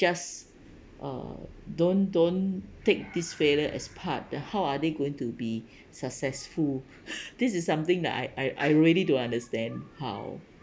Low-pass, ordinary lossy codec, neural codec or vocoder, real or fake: none; none; none; real